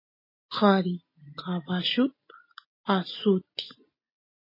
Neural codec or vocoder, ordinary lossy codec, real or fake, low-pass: none; MP3, 24 kbps; real; 5.4 kHz